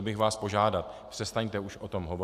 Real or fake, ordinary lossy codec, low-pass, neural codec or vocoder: real; AAC, 96 kbps; 14.4 kHz; none